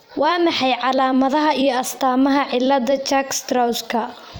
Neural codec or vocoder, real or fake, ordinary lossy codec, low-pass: vocoder, 44.1 kHz, 128 mel bands every 256 samples, BigVGAN v2; fake; none; none